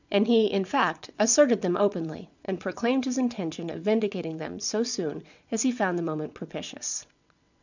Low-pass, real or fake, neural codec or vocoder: 7.2 kHz; fake; vocoder, 22.05 kHz, 80 mel bands, WaveNeXt